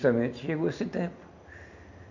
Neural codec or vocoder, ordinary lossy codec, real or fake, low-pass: none; none; real; 7.2 kHz